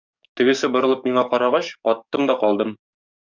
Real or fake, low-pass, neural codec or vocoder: fake; 7.2 kHz; codec, 44.1 kHz, 7.8 kbps, DAC